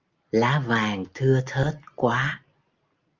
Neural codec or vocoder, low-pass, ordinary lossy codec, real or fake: none; 7.2 kHz; Opus, 24 kbps; real